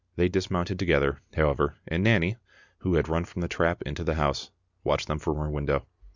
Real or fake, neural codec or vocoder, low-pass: real; none; 7.2 kHz